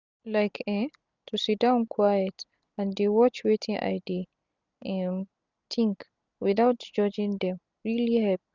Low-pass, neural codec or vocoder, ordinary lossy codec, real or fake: 7.2 kHz; none; none; real